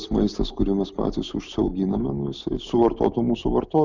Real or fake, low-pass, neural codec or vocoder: real; 7.2 kHz; none